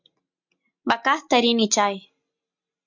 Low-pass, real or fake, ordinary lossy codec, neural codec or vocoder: 7.2 kHz; real; MP3, 64 kbps; none